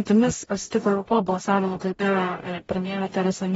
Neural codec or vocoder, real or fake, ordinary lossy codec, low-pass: codec, 44.1 kHz, 0.9 kbps, DAC; fake; AAC, 24 kbps; 19.8 kHz